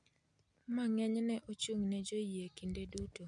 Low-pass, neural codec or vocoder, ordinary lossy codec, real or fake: 9.9 kHz; none; MP3, 64 kbps; real